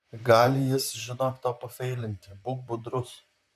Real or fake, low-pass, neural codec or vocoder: fake; 14.4 kHz; vocoder, 44.1 kHz, 128 mel bands, Pupu-Vocoder